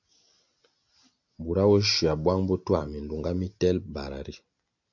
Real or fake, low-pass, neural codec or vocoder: real; 7.2 kHz; none